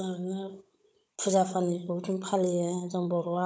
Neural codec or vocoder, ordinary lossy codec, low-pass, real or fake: codec, 16 kHz, 16 kbps, FunCodec, trained on Chinese and English, 50 frames a second; none; none; fake